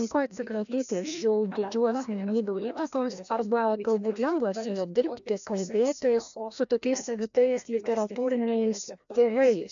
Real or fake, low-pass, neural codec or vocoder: fake; 7.2 kHz; codec, 16 kHz, 1 kbps, FreqCodec, larger model